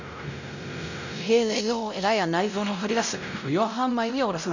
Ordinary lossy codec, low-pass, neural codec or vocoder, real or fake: none; 7.2 kHz; codec, 16 kHz, 0.5 kbps, X-Codec, WavLM features, trained on Multilingual LibriSpeech; fake